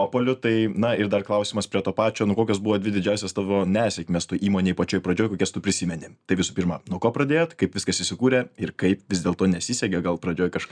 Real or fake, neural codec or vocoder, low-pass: real; none; 9.9 kHz